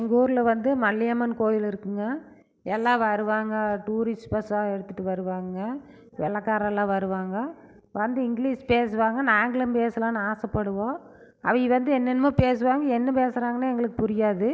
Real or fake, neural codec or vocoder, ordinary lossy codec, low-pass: real; none; none; none